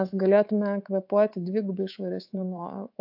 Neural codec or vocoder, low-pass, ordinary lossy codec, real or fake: codec, 24 kHz, 3.1 kbps, DualCodec; 5.4 kHz; AAC, 48 kbps; fake